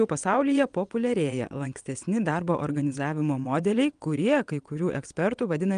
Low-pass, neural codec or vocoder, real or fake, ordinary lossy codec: 9.9 kHz; vocoder, 22.05 kHz, 80 mel bands, WaveNeXt; fake; Opus, 32 kbps